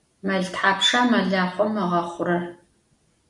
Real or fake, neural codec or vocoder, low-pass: real; none; 10.8 kHz